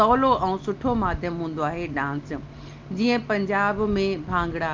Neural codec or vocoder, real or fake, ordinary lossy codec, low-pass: none; real; Opus, 24 kbps; 7.2 kHz